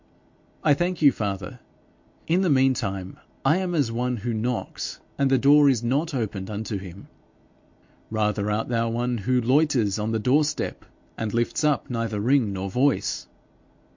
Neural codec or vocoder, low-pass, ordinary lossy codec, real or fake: none; 7.2 kHz; MP3, 48 kbps; real